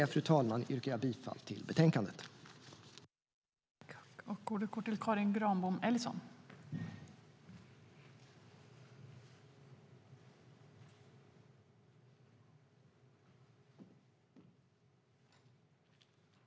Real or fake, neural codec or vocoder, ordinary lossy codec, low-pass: real; none; none; none